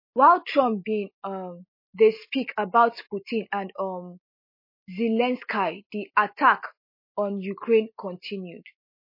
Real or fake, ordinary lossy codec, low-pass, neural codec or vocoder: real; MP3, 24 kbps; 5.4 kHz; none